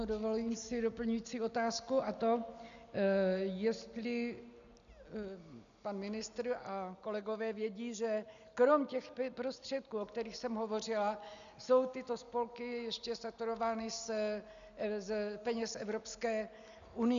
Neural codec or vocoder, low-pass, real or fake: none; 7.2 kHz; real